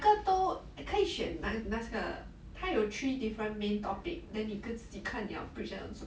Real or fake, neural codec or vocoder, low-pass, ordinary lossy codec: real; none; none; none